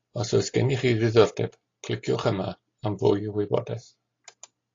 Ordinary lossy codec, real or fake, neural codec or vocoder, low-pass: AAC, 32 kbps; real; none; 7.2 kHz